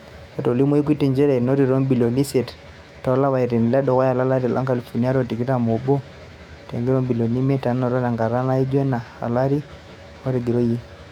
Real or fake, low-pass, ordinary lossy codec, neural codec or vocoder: fake; 19.8 kHz; none; autoencoder, 48 kHz, 128 numbers a frame, DAC-VAE, trained on Japanese speech